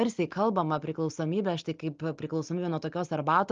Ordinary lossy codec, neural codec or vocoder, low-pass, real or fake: Opus, 32 kbps; none; 7.2 kHz; real